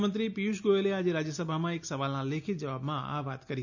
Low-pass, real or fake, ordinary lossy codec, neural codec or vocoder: 7.2 kHz; real; none; none